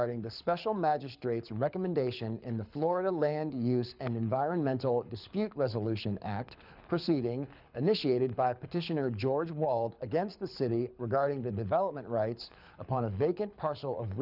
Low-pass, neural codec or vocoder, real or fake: 5.4 kHz; codec, 24 kHz, 6 kbps, HILCodec; fake